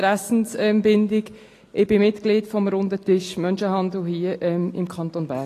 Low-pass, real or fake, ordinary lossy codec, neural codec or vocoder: 14.4 kHz; real; AAC, 48 kbps; none